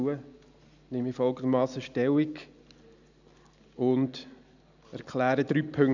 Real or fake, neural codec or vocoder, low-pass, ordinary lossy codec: real; none; 7.2 kHz; none